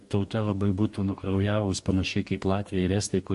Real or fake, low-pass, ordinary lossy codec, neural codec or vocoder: fake; 14.4 kHz; MP3, 48 kbps; codec, 44.1 kHz, 2.6 kbps, DAC